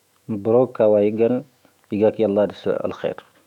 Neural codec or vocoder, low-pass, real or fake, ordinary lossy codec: autoencoder, 48 kHz, 128 numbers a frame, DAC-VAE, trained on Japanese speech; 19.8 kHz; fake; none